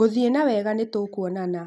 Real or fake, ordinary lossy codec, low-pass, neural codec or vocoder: real; none; none; none